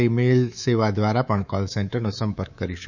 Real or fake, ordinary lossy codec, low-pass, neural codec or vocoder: fake; none; 7.2 kHz; codec, 24 kHz, 3.1 kbps, DualCodec